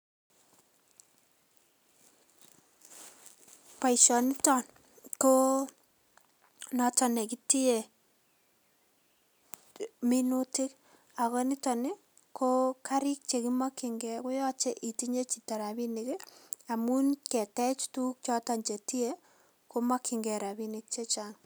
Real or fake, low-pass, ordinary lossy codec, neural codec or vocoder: real; none; none; none